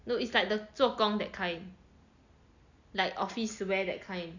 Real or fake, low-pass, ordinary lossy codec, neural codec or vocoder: real; 7.2 kHz; none; none